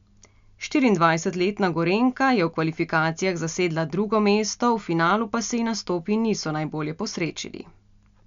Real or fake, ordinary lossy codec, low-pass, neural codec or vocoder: real; MP3, 64 kbps; 7.2 kHz; none